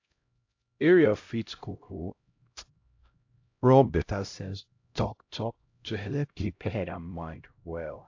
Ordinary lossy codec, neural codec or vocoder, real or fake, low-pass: AAC, 48 kbps; codec, 16 kHz, 0.5 kbps, X-Codec, HuBERT features, trained on LibriSpeech; fake; 7.2 kHz